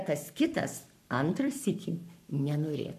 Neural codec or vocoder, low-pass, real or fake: codec, 44.1 kHz, 7.8 kbps, Pupu-Codec; 14.4 kHz; fake